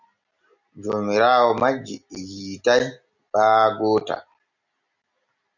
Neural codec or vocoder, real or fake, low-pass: none; real; 7.2 kHz